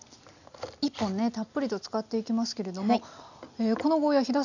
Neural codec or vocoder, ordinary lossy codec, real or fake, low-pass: none; none; real; 7.2 kHz